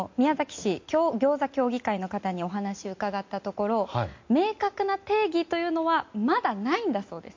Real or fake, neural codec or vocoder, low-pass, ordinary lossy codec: real; none; 7.2 kHz; MP3, 48 kbps